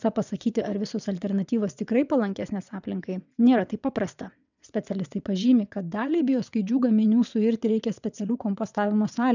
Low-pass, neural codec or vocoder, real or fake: 7.2 kHz; vocoder, 22.05 kHz, 80 mel bands, WaveNeXt; fake